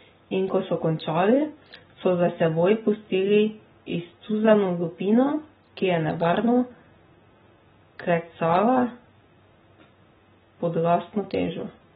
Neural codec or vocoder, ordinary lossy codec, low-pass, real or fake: none; AAC, 16 kbps; 19.8 kHz; real